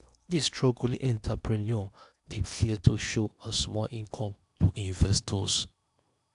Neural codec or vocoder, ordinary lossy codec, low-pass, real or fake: codec, 16 kHz in and 24 kHz out, 0.8 kbps, FocalCodec, streaming, 65536 codes; none; 10.8 kHz; fake